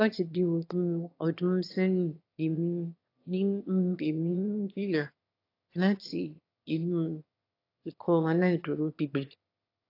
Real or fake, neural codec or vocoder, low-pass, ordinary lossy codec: fake; autoencoder, 22.05 kHz, a latent of 192 numbers a frame, VITS, trained on one speaker; 5.4 kHz; AAC, 32 kbps